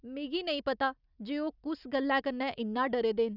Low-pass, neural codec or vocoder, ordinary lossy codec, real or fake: 5.4 kHz; none; none; real